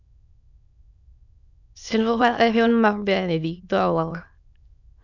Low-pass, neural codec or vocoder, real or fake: 7.2 kHz; autoencoder, 22.05 kHz, a latent of 192 numbers a frame, VITS, trained on many speakers; fake